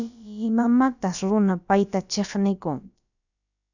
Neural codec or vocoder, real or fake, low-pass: codec, 16 kHz, about 1 kbps, DyCAST, with the encoder's durations; fake; 7.2 kHz